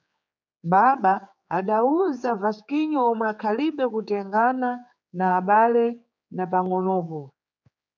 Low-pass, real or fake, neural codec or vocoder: 7.2 kHz; fake; codec, 16 kHz, 4 kbps, X-Codec, HuBERT features, trained on general audio